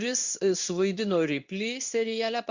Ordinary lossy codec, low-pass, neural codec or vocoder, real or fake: Opus, 64 kbps; 7.2 kHz; codec, 16 kHz in and 24 kHz out, 1 kbps, XY-Tokenizer; fake